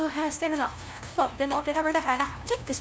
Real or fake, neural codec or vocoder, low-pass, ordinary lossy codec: fake; codec, 16 kHz, 0.5 kbps, FunCodec, trained on LibriTTS, 25 frames a second; none; none